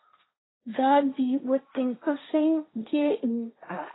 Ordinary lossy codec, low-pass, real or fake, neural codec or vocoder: AAC, 16 kbps; 7.2 kHz; fake; codec, 16 kHz, 1.1 kbps, Voila-Tokenizer